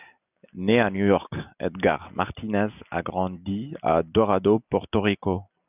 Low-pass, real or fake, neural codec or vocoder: 3.6 kHz; real; none